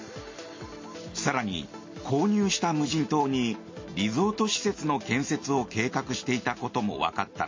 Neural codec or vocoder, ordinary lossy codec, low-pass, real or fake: none; MP3, 32 kbps; 7.2 kHz; real